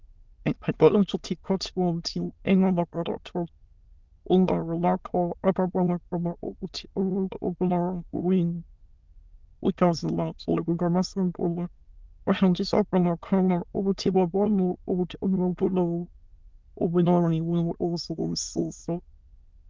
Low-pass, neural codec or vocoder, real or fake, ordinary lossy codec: 7.2 kHz; autoencoder, 22.05 kHz, a latent of 192 numbers a frame, VITS, trained on many speakers; fake; Opus, 32 kbps